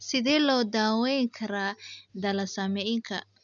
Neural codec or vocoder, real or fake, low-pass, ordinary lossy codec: none; real; 7.2 kHz; none